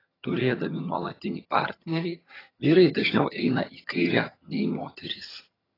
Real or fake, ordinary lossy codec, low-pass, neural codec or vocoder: fake; AAC, 24 kbps; 5.4 kHz; vocoder, 22.05 kHz, 80 mel bands, HiFi-GAN